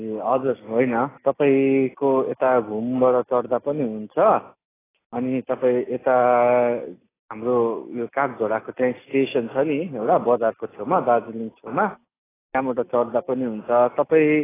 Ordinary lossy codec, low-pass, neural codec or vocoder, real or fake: AAC, 16 kbps; 3.6 kHz; none; real